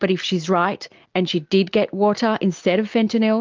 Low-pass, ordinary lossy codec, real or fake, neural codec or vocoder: 7.2 kHz; Opus, 32 kbps; real; none